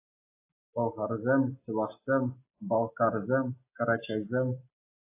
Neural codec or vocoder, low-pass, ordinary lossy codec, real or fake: none; 3.6 kHz; AAC, 24 kbps; real